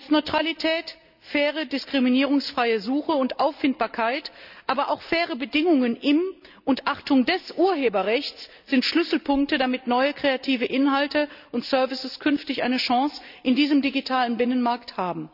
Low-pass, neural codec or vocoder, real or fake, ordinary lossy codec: 5.4 kHz; none; real; none